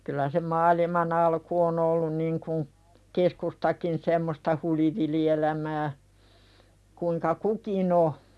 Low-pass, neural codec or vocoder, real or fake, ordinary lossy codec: none; none; real; none